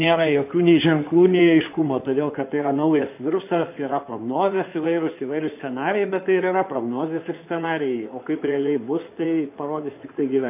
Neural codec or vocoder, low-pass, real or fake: codec, 16 kHz in and 24 kHz out, 2.2 kbps, FireRedTTS-2 codec; 3.6 kHz; fake